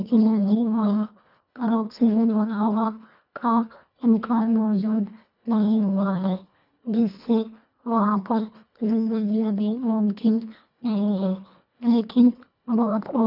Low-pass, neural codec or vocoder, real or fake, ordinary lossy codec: 5.4 kHz; codec, 24 kHz, 1.5 kbps, HILCodec; fake; none